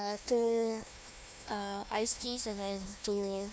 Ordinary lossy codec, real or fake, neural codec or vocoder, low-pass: none; fake; codec, 16 kHz, 1 kbps, FunCodec, trained on Chinese and English, 50 frames a second; none